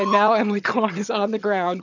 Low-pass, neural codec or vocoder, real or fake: 7.2 kHz; vocoder, 22.05 kHz, 80 mel bands, HiFi-GAN; fake